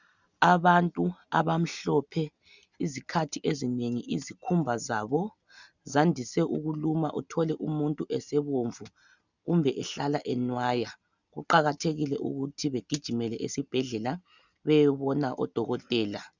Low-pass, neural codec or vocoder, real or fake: 7.2 kHz; none; real